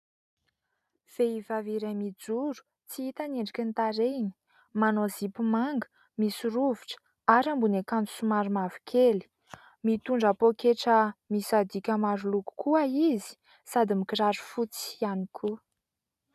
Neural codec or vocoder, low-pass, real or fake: none; 14.4 kHz; real